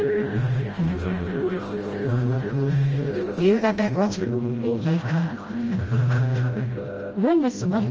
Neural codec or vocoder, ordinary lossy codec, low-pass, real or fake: codec, 16 kHz, 0.5 kbps, FreqCodec, smaller model; Opus, 24 kbps; 7.2 kHz; fake